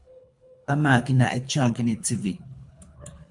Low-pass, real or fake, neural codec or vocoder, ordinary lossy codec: 10.8 kHz; fake; codec, 24 kHz, 3 kbps, HILCodec; MP3, 64 kbps